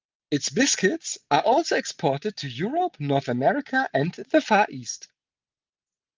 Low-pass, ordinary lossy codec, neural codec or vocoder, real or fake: 7.2 kHz; Opus, 24 kbps; none; real